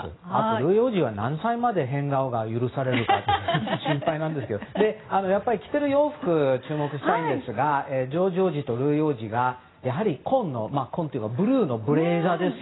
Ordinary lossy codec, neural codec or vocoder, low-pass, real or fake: AAC, 16 kbps; none; 7.2 kHz; real